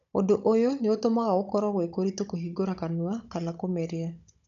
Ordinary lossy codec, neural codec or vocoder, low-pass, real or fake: none; codec, 16 kHz, 16 kbps, FunCodec, trained on Chinese and English, 50 frames a second; 7.2 kHz; fake